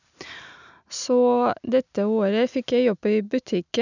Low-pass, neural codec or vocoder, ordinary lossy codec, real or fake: 7.2 kHz; none; none; real